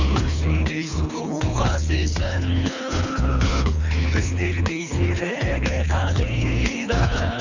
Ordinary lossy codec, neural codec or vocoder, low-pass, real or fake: Opus, 64 kbps; codec, 24 kHz, 3 kbps, HILCodec; 7.2 kHz; fake